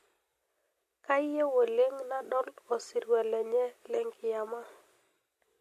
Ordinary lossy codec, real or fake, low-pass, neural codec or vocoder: MP3, 64 kbps; real; 14.4 kHz; none